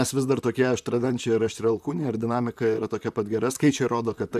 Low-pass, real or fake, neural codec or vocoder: 14.4 kHz; fake; vocoder, 44.1 kHz, 128 mel bands, Pupu-Vocoder